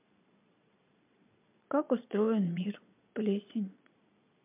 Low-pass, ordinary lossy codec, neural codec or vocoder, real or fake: 3.6 kHz; MP3, 32 kbps; vocoder, 22.05 kHz, 80 mel bands, Vocos; fake